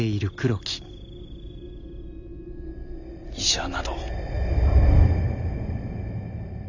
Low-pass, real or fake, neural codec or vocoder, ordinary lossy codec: 7.2 kHz; real; none; none